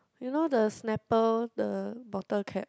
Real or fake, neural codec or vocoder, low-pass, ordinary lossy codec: real; none; none; none